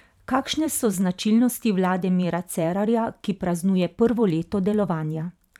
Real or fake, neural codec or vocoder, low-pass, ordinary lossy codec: fake; vocoder, 44.1 kHz, 128 mel bands every 512 samples, BigVGAN v2; 19.8 kHz; none